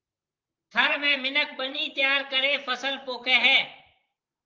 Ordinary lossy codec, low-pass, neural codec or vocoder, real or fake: Opus, 32 kbps; 7.2 kHz; vocoder, 44.1 kHz, 128 mel bands, Pupu-Vocoder; fake